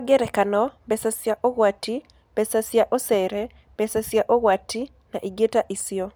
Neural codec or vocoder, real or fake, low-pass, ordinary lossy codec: none; real; none; none